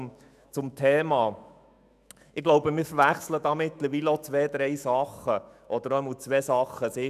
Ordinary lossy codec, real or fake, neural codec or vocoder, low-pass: none; fake; autoencoder, 48 kHz, 128 numbers a frame, DAC-VAE, trained on Japanese speech; 14.4 kHz